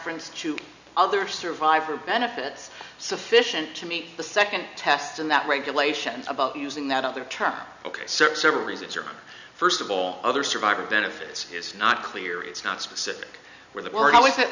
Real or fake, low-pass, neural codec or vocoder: real; 7.2 kHz; none